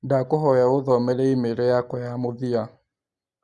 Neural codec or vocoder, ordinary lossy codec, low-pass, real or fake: none; Opus, 64 kbps; 10.8 kHz; real